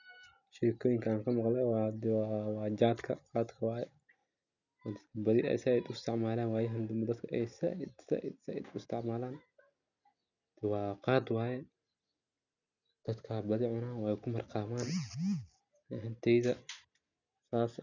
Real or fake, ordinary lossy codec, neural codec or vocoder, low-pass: real; none; none; 7.2 kHz